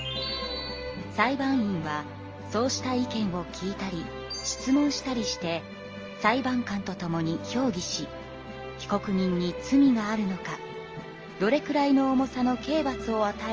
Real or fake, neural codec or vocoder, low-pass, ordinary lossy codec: real; none; 7.2 kHz; Opus, 24 kbps